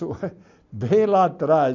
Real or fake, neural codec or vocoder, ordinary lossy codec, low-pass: real; none; none; 7.2 kHz